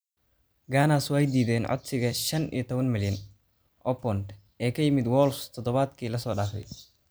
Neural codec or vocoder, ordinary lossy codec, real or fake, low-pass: none; none; real; none